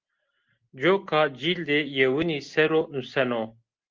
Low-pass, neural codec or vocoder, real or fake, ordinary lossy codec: 7.2 kHz; none; real; Opus, 16 kbps